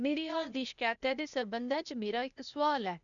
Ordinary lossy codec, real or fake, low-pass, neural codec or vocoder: none; fake; 7.2 kHz; codec, 16 kHz, 0.8 kbps, ZipCodec